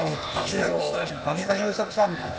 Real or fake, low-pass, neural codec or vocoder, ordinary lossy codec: fake; none; codec, 16 kHz, 0.8 kbps, ZipCodec; none